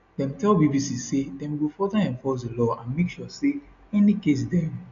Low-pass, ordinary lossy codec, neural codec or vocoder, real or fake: 7.2 kHz; AAC, 96 kbps; none; real